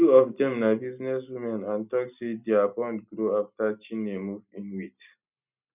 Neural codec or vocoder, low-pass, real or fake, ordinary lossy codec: none; 3.6 kHz; real; none